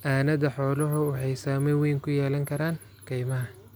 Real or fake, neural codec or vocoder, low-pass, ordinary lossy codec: real; none; none; none